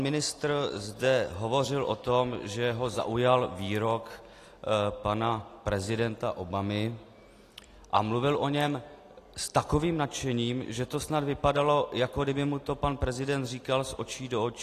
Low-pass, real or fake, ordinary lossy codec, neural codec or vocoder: 14.4 kHz; real; AAC, 48 kbps; none